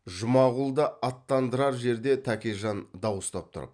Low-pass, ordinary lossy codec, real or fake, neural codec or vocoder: 9.9 kHz; none; real; none